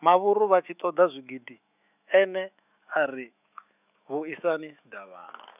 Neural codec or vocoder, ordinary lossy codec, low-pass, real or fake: autoencoder, 48 kHz, 128 numbers a frame, DAC-VAE, trained on Japanese speech; none; 3.6 kHz; fake